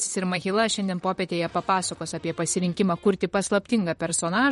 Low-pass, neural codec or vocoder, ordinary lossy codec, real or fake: 19.8 kHz; vocoder, 44.1 kHz, 128 mel bands, Pupu-Vocoder; MP3, 48 kbps; fake